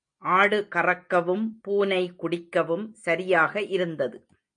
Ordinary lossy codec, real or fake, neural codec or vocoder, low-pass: MP3, 48 kbps; real; none; 9.9 kHz